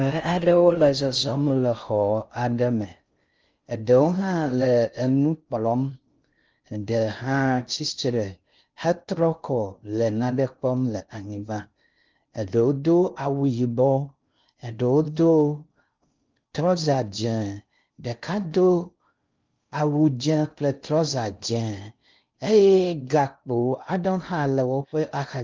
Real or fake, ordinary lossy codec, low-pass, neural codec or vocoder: fake; Opus, 24 kbps; 7.2 kHz; codec, 16 kHz in and 24 kHz out, 0.6 kbps, FocalCodec, streaming, 4096 codes